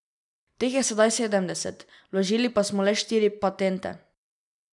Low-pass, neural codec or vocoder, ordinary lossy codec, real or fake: 10.8 kHz; none; none; real